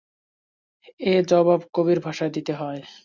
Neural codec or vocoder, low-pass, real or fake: none; 7.2 kHz; real